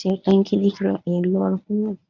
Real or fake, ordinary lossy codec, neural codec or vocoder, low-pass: fake; none; codec, 24 kHz, 0.9 kbps, WavTokenizer, medium speech release version 1; 7.2 kHz